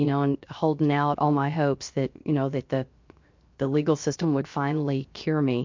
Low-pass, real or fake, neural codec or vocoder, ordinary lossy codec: 7.2 kHz; fake; codec, 16 kHz, 0.7 kbps, FocalCodec; MP3, 48 kbps